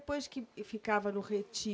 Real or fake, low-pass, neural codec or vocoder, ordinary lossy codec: real; none; none; none